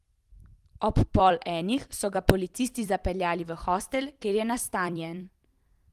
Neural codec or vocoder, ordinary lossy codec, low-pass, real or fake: vocoder, 44.1 kHz, 128 mel bands every 256 samples, BigVGAN v2; Opus, 24 kbps; 14.4 kHz; fake